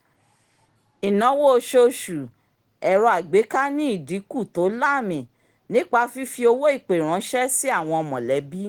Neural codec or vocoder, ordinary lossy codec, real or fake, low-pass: none; Opus, 16 kbps; real; 19.8 kHz